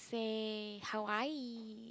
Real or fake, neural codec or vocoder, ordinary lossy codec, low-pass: real; none; none; none